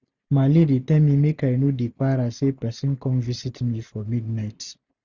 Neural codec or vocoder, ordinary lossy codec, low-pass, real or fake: none; none; 7.2 kHz; real